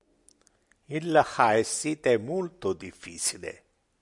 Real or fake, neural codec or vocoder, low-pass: real; none; 10.8 kHz